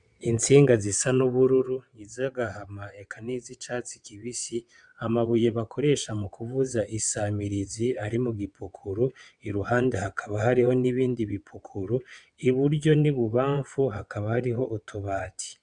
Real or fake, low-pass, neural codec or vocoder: fake; 9.9 kHz; vocoder, 22.05 kHz, 80 mel bands, WaveNeXt